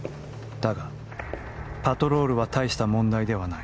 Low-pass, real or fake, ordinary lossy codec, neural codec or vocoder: none; real; none; none